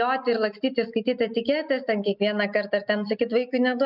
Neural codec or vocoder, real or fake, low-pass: none; real; 5.4 kHz